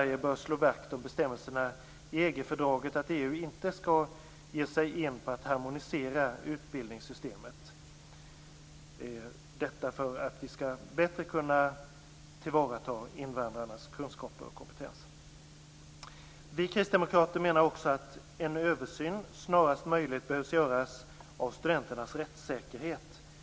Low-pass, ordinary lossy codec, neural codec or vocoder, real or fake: none; none; none; real